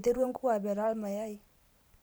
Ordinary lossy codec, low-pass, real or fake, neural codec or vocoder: none; none; fake; vocoder, 44.1 kHz, 128 mel bands every 512 samples, BigVGAN v2